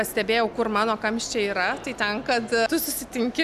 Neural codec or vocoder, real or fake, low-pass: none; real; 14.4 kHz